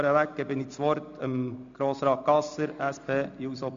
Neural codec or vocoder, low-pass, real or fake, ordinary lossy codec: none; 7.2 kHz; real; MP3, 64 kbps